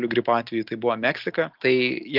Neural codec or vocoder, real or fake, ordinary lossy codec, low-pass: none; real; Opus, 24 kbps; 5.4 kHz